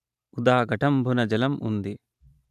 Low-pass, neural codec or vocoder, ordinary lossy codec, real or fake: 14.4 kHz; vocoder, 44.1 kHz, 128 mel bands every 512 samples, BigVGAN v2; none; fake